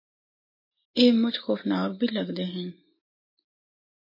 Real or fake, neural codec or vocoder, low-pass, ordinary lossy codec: real; none; 5.4 kHz; MP3, 24 kbps